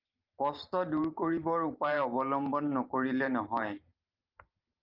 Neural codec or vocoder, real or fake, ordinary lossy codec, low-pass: vocoder, 44.1 kHz, 128 mel bands every 512 samples, BigVGAN v2; fake; Opus, 24 kbps; 5.4 kHz